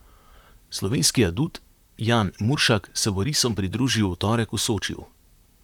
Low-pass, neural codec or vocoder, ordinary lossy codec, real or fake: 19.8 kHz; vocoder, 44.1 kHz, 128 mel bands, Pupu-Vocoder; none; fake